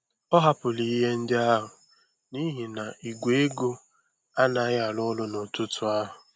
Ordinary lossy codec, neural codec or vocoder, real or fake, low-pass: none; none; real; none